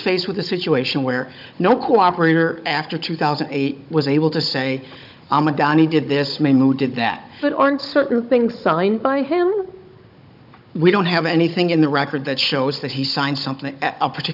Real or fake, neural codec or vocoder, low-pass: fake; codec, 16 kHz, 16 kbps, FunCodec, trained on Chinese and English, 50 frames a second; 5.4 kHz